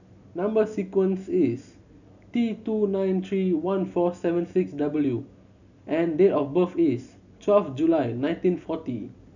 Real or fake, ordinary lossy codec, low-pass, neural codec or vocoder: real; none; 7.2 kHz; none